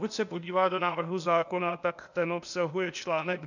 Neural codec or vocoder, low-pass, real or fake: codec, 16 kHz, 0.8 kbps, ZipCodec; 7.2 kHz; fake